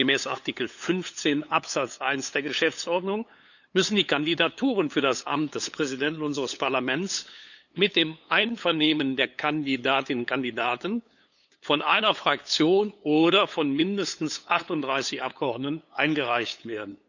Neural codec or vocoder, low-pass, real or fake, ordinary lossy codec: codec, 16 kHz, 8 kbps, FunCodec, trained on LibriTTS, 25 frames a second; 7.2 kHz; fake; none